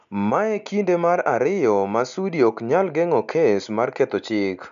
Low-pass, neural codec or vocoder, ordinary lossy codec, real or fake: 7.2 kHz; none; none; real